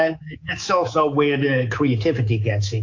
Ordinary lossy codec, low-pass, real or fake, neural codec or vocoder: AAC, 48 kbps; 7.2 kHz; fake; codec, 24 kHz, 3.1 kbps, DualCodec